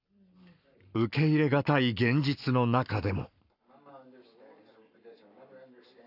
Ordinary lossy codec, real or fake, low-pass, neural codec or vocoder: none; fake; 5.4 kHz; codec, 44.1 kHz, 7.8 kbps, Pupu-Codec